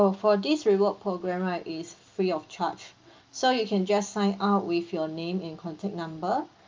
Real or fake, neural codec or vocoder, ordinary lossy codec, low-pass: real; none; Opus, 24 kbps; 7.2 kHz